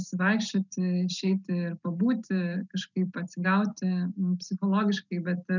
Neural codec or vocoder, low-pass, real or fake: none; 7.2 kHz; real